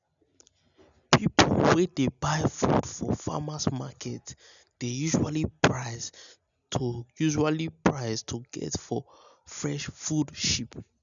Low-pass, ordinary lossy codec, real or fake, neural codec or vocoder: 7.2 kHz; none; real; none